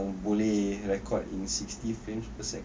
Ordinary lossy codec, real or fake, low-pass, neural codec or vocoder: none; real; none; none